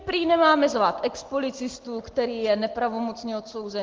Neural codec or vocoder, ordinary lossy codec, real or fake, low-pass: none; Opus, 16 kbps; real; 7.2 kHz